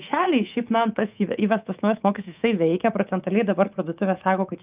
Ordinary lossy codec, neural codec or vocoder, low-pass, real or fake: Opus, 32 kbps; codec, 24 kHz, 3.1 kbps, DualCodec; 3.6 kHz; fake